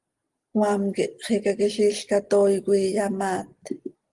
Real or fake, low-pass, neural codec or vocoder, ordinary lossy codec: real; 10.8 kHz; none; Opus, 24 kbps